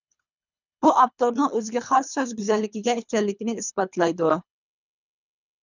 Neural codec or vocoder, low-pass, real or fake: codec, 24 kHz, 3 kbps, HILCodec; 7.2 kHz; fake